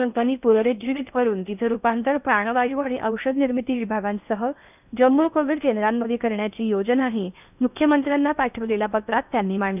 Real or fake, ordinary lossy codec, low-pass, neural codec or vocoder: fake; none; 3.6 kHz; codec, 16 kHz in and 24 kHz out, 0.6 kbps, FocalCodec, streaming, 4096 codes